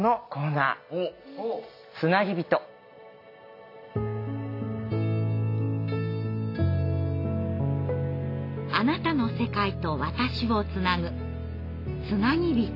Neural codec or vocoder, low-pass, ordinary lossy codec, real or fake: none; 5.4 kHz; AAC, 32 kbps; real